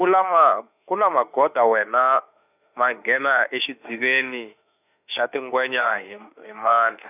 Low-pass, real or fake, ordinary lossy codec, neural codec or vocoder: 3.6 kHz; fake; none; autoencoder, 48 kHz, 32 numbers a frame, DAC-VAE, trained on Japanese speech